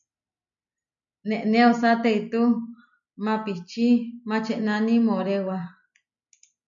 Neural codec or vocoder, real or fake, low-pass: none; real; 7.2 kHz